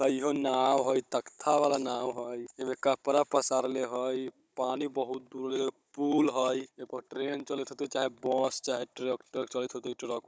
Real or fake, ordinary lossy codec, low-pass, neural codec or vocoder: fake; none; none; codec, 16 kHz, 16 kbps, FunCodec, trained on Chinese and English, 50 frames a second